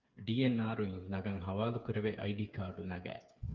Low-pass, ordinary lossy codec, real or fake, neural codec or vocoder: 7.2 kHz; Opus, 32 kbps; fake; codec, 16 kHz, 8 kbps, FreqCodec, smaller model